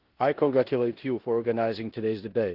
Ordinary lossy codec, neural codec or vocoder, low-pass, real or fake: Opus, 16 kbps; codec, 16 kHz in and 24 kHz out, 0.9 kbps, LongCat-Audio-Codec, four codebook decoder; 5.4 kHz; fake